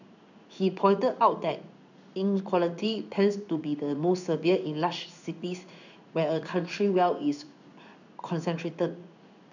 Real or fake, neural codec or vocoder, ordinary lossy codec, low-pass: fake; codec, 16 kHz in and 24 kHz out, 1 kbps, XY-Tokenizer; none; 7.2 kHz